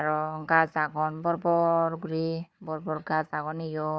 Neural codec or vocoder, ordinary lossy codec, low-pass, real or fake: codec, 16 kHz, 4 kbps, FunCodec, trained on Chinese and English, 50 frames a second; none; none; fake